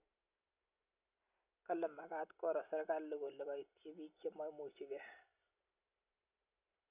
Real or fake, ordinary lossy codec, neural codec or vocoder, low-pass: real; none; none; 3.6 kHz